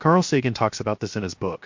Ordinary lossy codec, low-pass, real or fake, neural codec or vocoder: MP3, 48 kbps; 7.2 kHz; fake; codec, 16 kHz, 0.7 kbps, FocalCodec